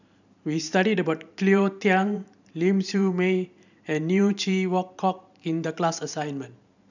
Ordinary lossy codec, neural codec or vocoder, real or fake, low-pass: none; vocoder, 44.1 kHz, 128 mel bands every 512 samples, BigVGAN v2; fake; 7.2 kHz